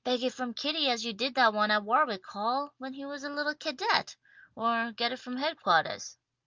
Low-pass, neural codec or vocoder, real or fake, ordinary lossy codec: 7.2 kHz; none; real; Opus, 24 kbps